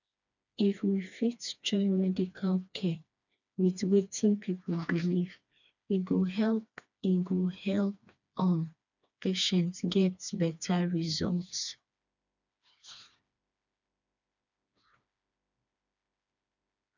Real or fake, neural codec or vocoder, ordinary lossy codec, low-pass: fake; codec, 16 kHz, 2 kbps, FreqCodec, smaller model; none; 7.2 kHz